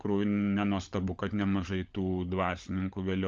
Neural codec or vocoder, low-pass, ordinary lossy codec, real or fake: codec, 16 kHz, 16 kbps, FunCodec, trained on LibriTTS, 50 frames a second; 7.2 kHz; Opus, 32 kbps; fake